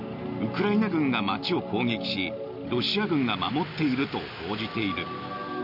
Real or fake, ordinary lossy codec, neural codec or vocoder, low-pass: real; none; none; 5.4 kHz